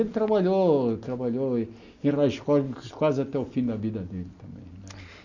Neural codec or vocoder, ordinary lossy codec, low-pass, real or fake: none; none; 7.2 kHz; real